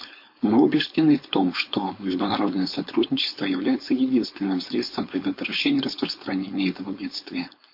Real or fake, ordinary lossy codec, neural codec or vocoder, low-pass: fake; MP3, 32 kbps; codec, 16 kHz, 4.8 kbps, FACodec; 5.4 kHz